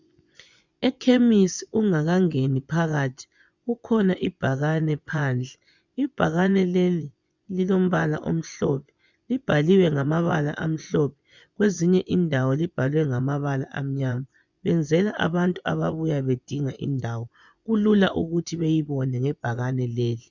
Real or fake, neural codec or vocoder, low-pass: fake; vocoder, 24 kHz, 100 mel bands, Vocos; 7.2 kHz